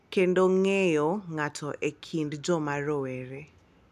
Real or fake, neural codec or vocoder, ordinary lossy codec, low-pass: real; none; none; 14.4 kHz